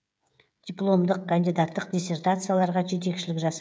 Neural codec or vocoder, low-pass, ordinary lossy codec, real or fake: codec, 16 kHz, 16 kbps, FreqCodec, smaller model; none; none; fake